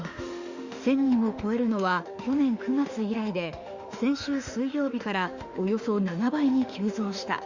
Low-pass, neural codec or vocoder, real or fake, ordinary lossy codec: 7.2 kHz; autoencoder, 48 kHz, 32 numbers a frame, DAC-VAE, trained on Japanese speech; fake; Opus, 64 kbps